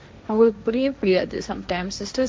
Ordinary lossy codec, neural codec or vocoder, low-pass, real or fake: none; codec, 16 kHz, 1.1 kbps, Voila-Tokenizer; none; fake